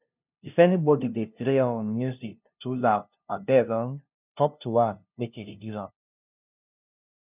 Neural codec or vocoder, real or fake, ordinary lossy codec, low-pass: codec, 16 kHz, 0.5 kbps, FunCodec, trained on LibriTTS, 25 frames a second; fake; none; 3.6 kHz